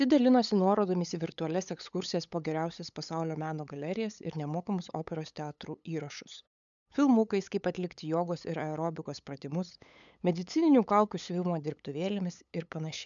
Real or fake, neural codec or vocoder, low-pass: fake; codec, 16 kHz, 8 kbps, FunCodec, trained on LibriTTS, 25 frames a second; 7.2 kHz